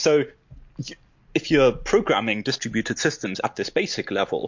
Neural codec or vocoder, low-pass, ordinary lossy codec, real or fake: none; 7.2 kHz; MP3, 48 kbps; real